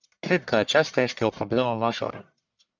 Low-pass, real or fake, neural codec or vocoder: 7.2 kHz; fake; codec, 44.1 kHz, 1.7 kbps, Pupu-Codec